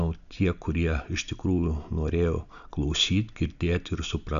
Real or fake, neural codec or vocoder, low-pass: real; none; 7.2 kHz